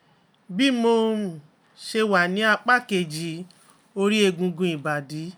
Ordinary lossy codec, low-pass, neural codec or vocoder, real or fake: none; none; none; real